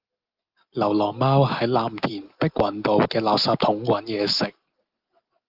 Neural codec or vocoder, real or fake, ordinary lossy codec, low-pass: none; real; Opus, 24 kbps; 5.4 kHz